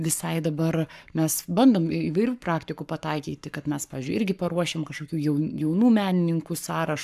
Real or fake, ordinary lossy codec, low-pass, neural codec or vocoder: fake; AAC, 96 kbps; 14.4 kHz; codec, 44.1 kHz, 7.8 kbps, Pupu-Codec